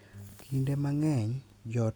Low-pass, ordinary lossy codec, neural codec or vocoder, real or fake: none; none; none; real